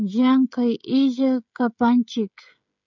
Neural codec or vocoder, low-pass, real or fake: codec, 16 kHz, 16 kbps, FreqCodec, smaller model; 7.2 kHz; fake